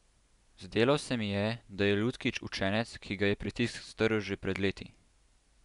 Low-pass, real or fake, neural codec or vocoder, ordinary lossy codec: 10.8 kHz; real; none; none